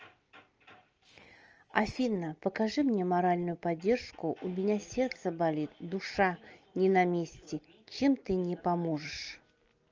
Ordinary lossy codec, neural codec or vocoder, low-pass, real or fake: Opus, 24 kbps; none; 7.2 kHz; real